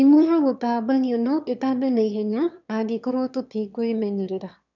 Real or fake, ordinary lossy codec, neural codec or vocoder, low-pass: fake; none; autoencoder, 22.05 kHz, a latent of 192 numbers a frame, VITS, trained on one speaker; 7.2 kHz